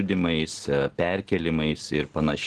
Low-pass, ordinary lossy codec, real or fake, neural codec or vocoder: 10.8 kHz; Opus, 16 kbps; real; none